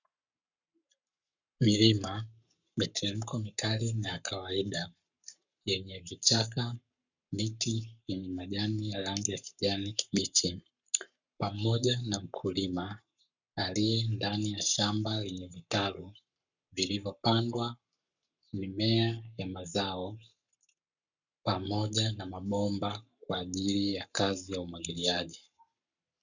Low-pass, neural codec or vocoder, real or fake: 7.2 kHz; codec, 44.1 kHz, 7.8 kbps, Pupu-Codec; fake